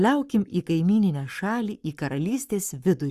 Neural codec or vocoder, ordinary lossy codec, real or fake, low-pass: codec, 44.1 kHz, 7.8 kbps, Pupu-Codec; Opus, 64 kbps; fake; 14.4 kHz